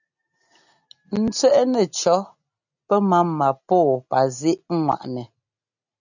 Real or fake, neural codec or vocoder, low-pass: real; none; 7.2 kHz